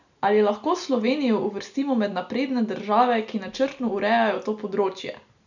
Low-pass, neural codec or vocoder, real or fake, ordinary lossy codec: 7.2 kHz; none; real; none